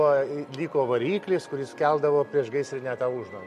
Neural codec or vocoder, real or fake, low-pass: none; real; 14.4 kHz